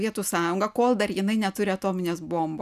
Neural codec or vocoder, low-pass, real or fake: none; 14.4 kHz; real